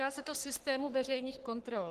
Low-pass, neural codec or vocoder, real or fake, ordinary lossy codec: 14.4 kHz; codec, 44.1 kHz, 3.4 kbps, Pupu-Codec; fake; Opus, 16 kbps